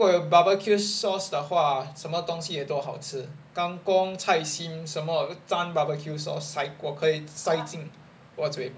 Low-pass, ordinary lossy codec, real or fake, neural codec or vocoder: none; none; real; none